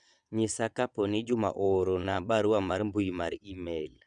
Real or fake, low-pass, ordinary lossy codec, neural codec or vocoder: fake; 9.9 kHz; none; vocoder, 22.05 kHz, 80 mel bands, WaveNeXt